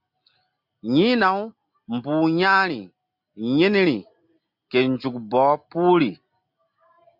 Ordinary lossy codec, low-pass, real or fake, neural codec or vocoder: MP3, 48 kbps; 5.4 kHz; real; none